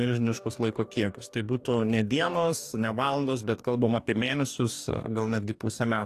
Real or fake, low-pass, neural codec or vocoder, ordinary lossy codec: fake; 14.4 kHz; codec, 44.1 kHz, 2.6 kbps, DAC; AAC, 64 kbps